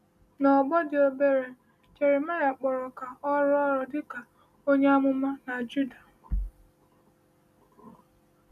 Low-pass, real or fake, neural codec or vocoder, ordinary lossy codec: 14.4 kHz; real; none; none